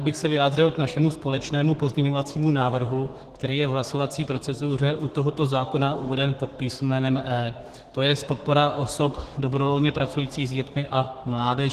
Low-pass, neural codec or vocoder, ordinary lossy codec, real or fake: 14.4 kHz; codec, 32 kHz, 1.9 kbps, SNAC; Opus, 16 kbps; fake